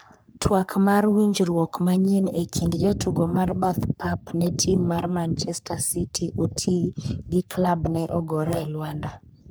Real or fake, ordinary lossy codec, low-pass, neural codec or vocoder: fake; none; none; codec, 44.1 kHz, 3.4 kbps, Pupu-Codec